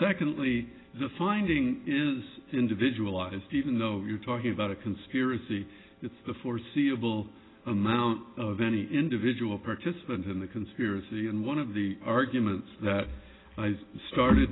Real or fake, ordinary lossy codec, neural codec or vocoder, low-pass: real; AAC, 16 kbps; none; 7.2 kHz